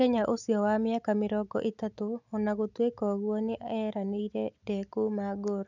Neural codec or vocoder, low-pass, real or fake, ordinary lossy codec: none; 7.2 kHz; real; none